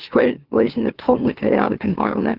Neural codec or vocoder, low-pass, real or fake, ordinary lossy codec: autoencoder, 44.1 kHz, a latent of 192 numbers a frame, MeloTTS; 5.4 kHz; fake; Opus, 16 kbps